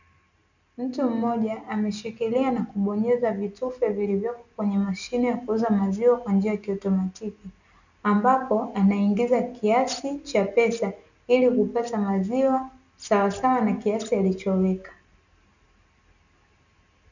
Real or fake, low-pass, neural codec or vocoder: real; 7.2 kHz; none